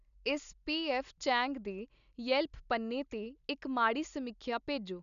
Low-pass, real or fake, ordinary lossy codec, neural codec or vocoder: 7.2 kHz; real; none; none